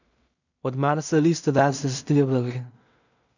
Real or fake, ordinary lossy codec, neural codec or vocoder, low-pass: fake; none; codec, 16 kHz in and 24 kHz out, 0.4 kbps, LongCat-Audio-Codec, two codebook decoder; 7.2 kHz